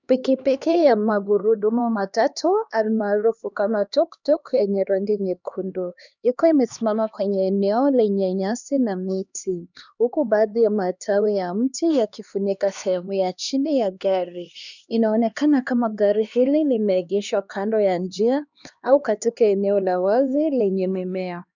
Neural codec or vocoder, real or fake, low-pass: codec, 16 kHz, 2 kbps, X-Codec, HuBERT features, trained on LibriSpeech; fake; 7.2 kHz